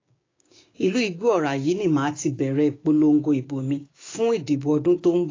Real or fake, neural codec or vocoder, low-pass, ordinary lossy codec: fake; codec, 16 kHz, 6 kbps, DAC; 7.2 kHz; AAC, 32 kbps